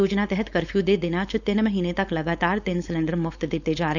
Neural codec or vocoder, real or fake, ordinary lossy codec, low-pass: codec, 16 kHz, 4.8 kbps, FACodec; fake; none; 7.2 kHz